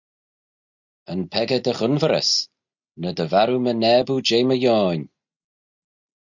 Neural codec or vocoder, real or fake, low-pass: none; real; 7.2 kHz